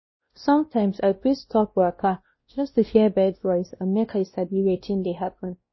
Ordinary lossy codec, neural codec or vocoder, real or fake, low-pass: MP3, 24 kbps; codec, 16 kHz, 1 kbps, X-Codec, WavLM features, trained on Multilingual LibriSpeech; fake; 7.2 kHz